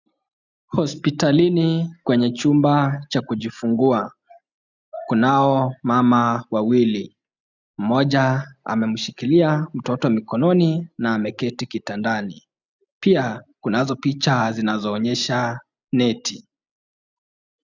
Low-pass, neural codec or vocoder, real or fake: 7.2 kHz; none; real